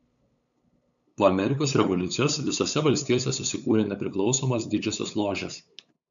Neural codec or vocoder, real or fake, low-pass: codec, 16 kHz, 8 kbps, FunCodec, trained on LibriTTS, 25 frames a second; fake; 7.2 kHz